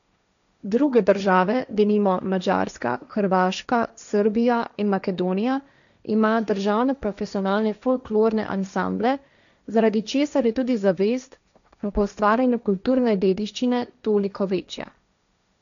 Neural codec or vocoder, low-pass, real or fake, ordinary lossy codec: codec, 16 kHz, 1.1 kbps, Voila-Tokenizer; 7.2 kHz; fake; none